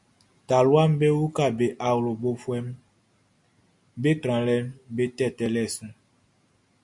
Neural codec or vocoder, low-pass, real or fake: none; 10.8 kHz; real